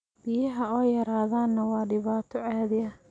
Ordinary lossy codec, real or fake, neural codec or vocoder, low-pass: none; real; none; 9.9 kHz